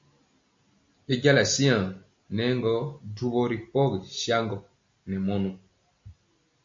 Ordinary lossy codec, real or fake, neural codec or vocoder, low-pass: MP3, 48 kbps; real; none; 7.2 kHz